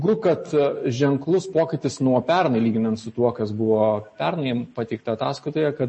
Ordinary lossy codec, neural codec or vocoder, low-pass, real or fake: MP3, 32 kbps; none; 9.9 kHz; real